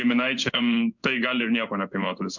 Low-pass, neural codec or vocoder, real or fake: 7.2 kHz; codec, 16 kHz in and 24 kHz out, 1 kbps, XY-Tokenizer; fake